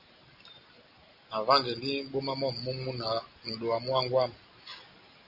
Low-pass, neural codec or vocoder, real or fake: 5.4 kHz; none; real